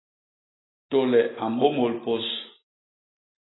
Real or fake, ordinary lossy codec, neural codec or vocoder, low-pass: real; AAC, 16 kbps; none; 7.2 kHz